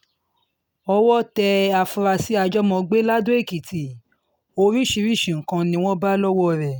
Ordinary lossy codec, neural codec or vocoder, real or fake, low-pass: none; none; real; none